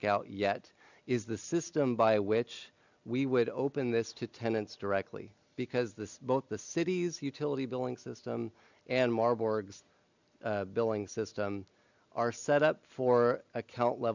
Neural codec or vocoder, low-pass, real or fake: none; 7.2 kHz; real